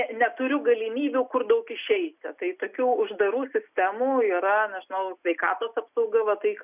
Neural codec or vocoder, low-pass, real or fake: none; 3.6 kHz; real